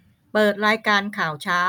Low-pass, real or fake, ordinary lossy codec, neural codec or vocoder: 19.8 kHz; real; none; none